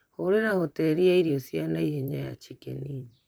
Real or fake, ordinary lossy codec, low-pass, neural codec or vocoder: fake; none; none; vocoder, 44.1 kHz, 128 mel bands, Pupu-Vocoder